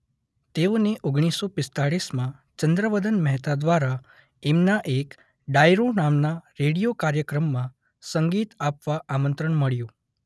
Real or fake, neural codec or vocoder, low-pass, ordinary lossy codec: real; none; none; none